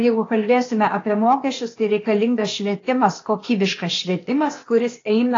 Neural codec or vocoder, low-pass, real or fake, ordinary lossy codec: codec, 16 kHz, 0.8 kbps, ZipCodec; 7.2 kHz; fake; AAC, 32 kbps